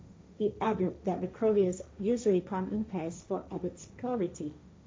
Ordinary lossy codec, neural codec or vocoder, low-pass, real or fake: none; codec, 16 kHz, 1.1 kbps, Voila-Tokenizer; none; fake